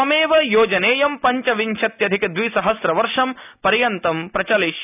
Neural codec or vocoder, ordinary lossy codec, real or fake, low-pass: none; none; real; 3.6 kHz